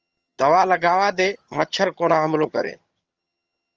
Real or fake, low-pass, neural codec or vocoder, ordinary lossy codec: fake; 7.2 kHz; vocoder, 22.05 kHz, 80 mel bands, HiFi-GAN; Opus, 24 kbps